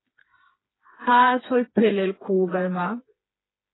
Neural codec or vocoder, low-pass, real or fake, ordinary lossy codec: codec, 16 kHz, 4 kbps, FreqCodec, smaller model; 7.2 kHz; fake; AAC, 16 kbps